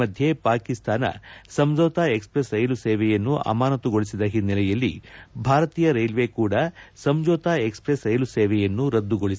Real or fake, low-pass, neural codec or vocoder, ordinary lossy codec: real; none; none; none